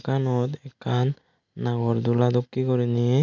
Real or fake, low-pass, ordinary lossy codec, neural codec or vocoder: real; 7.2 kHz; none; none